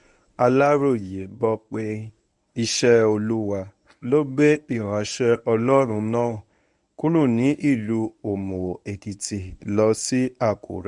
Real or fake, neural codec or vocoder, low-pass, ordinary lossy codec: fake; codec, 24 kHz, 0.9 kbps, WavTokenizer, medium speech release version 1; 10.8 kHz; none